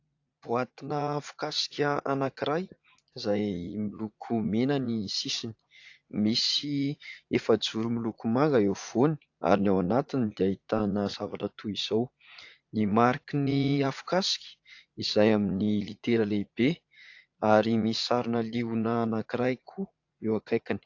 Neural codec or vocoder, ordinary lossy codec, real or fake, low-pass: vocoder, 44.1 kHz, 80 mel bands, Vocos; AAC, 48 kbps; fake; 7.2 kHz